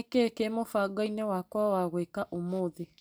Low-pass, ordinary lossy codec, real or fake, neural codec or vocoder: none; none; real; none